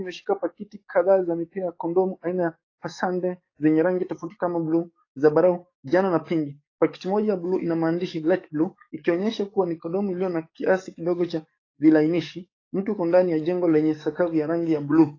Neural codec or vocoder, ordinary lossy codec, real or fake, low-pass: codec, 44.1 kHz, 7.8 kbps, DAC; AAC, 32 kbps; fake; 7.2 kHz